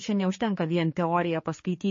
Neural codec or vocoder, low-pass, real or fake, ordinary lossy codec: codec, 16 kHz, 4 kbps, X-Codec, HuBERT features, trained on general audio; 7.2 kHz; fake; MP3, 32 kbps